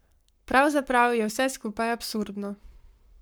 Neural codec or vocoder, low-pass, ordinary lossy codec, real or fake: codec, 44.1 kHz, 7.8 kbps, Pupu-Codec; none; none; fake